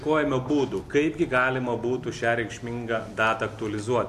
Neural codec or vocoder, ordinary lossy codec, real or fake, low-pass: none; AAC, 64 kbps; real; 14.4 kHz